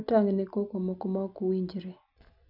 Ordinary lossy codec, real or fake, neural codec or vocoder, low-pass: none; real; none; 5.4 kHz